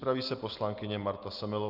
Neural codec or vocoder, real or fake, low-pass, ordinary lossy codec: none; real; 5.4 kHz; Opus, 32 kbps